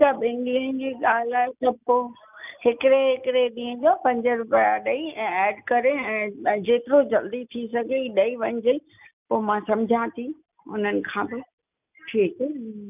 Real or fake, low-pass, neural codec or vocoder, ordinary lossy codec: real; 3.6 kHz; none; none